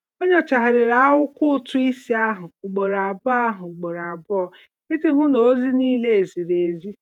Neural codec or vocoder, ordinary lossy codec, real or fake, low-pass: vocoder, 48 kHz, 128 mel bands, Vocos; none; fake; 19.8 kHz